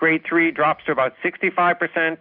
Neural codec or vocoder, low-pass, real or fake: none; 5.4 kHz; real